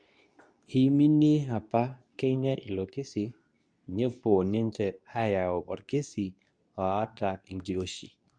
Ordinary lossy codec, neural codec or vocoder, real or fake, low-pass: none; codec, 24 kHz, 0.9 kbps, WavTokenizer, medium speech release version 2; fake; 9.9 kHz